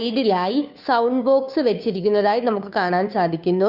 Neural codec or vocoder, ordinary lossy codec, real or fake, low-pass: codec, 16 kHz, 2 kbps, FunCodec, trained on Chinese and English, 25 frames a second; none; fake; 5.4 kHz